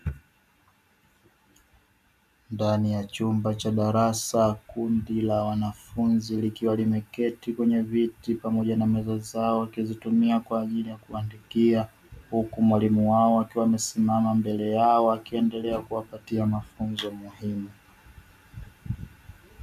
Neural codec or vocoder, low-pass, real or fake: none; 14.4 kHz; real